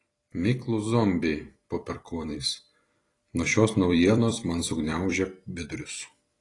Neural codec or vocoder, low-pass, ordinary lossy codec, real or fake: vocoder, 44.1 kHz, 128 mel bands every 256 samples, BigVGAN v2; 10.8 kHz; AAC, 32 kbps; fake